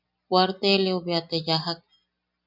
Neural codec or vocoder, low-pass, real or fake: none; 5.4 kHz; real